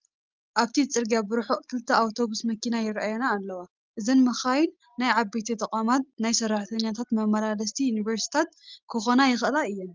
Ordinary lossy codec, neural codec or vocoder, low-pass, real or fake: Opus, 32 kbps; none; 7.2 kHz; real